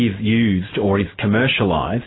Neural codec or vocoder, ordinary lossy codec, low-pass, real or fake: none; AAC, 16 kbps; 7.2 kHz; real